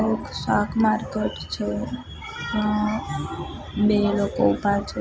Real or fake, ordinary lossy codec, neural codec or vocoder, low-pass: real; none; none; none